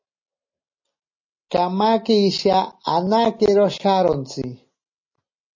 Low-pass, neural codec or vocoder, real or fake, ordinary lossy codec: 7.2 kHz; none; real; MP3, 32 kbps